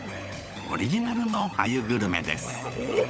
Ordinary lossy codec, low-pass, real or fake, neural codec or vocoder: none; none; fake; codec, 16 kHz, 16 kbps, FunCodec, trained on LibriTTS, 50 frames a second